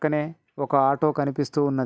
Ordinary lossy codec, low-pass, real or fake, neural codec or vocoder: none; none; real; none